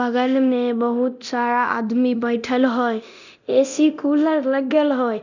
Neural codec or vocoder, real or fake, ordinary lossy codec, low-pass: codec, 24 kHz, 0.9 kbps, DualCodec; fake; none; 7.2 kHz